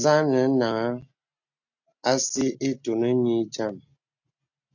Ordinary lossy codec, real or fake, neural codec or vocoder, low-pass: AAC, 48 kbps; real; none; 7.2 kHz